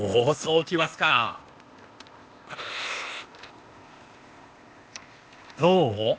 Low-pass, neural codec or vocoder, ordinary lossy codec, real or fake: none; codec, 16 kHz, 0.8 kbps, ZipCodec; none; fake